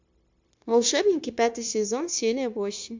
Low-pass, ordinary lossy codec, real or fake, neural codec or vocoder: 7.2 kHz; MP3, 64 kbps; fake; codec, 16 kHz, 0.9 kbps, LongCat-Audio-Codec